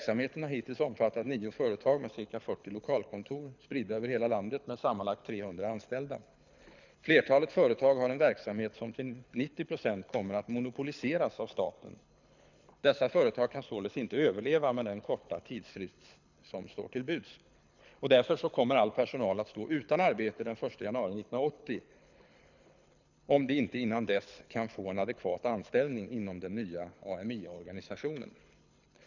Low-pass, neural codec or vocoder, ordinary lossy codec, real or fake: 7.2 kHz; codec, 24 kHz, 6 kbps, HILCodec; none; fake